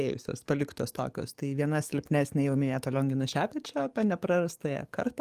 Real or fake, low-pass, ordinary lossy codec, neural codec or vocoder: fake; 14.4 kHz; Opus, 32 kbps; codec, 44.1 kHz, 7.8 kbps, DAC